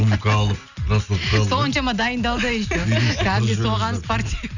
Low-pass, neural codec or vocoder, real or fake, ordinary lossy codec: 7.2 kHz; none; real; none